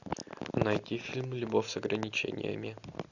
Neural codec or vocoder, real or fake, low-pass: none; real; 7.2 kHz